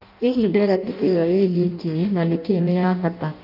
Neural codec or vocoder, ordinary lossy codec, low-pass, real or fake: codec, 16 kHz in and 24 kHz out, 0.6 kbps, FireRedTTS-2 codec; none; 5.4 kHz; fake